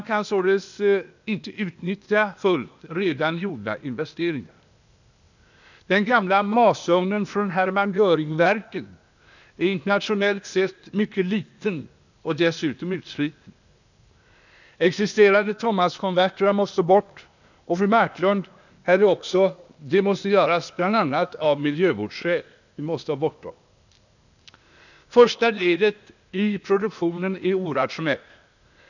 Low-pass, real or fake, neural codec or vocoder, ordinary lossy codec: 7.2 kHz; fake; codec, 16 kHz, 0.8 kbps, ZipCodec; none